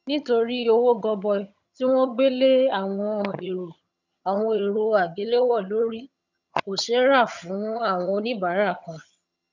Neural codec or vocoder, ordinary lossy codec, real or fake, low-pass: vocoder, 22.05 kHz, 80 mel bands, HiFi-GAN; none; fake; 7.2 kHz